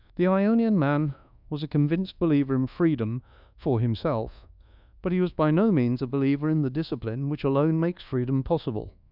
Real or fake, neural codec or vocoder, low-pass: fake; codec, 24 kHz, 1.2 kbps, DualCodec; 5.4 kHz